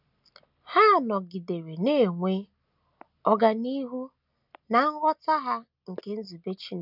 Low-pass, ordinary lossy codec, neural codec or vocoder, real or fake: 5.4 kHz; none; none; real